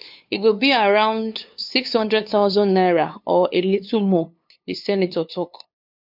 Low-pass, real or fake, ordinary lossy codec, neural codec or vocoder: 5.4 kHz; fake; MP3, 48 kbps; codec, 16 kHz, 2 kbps, FunCodec, trained on LibriTTS, 25 frames a second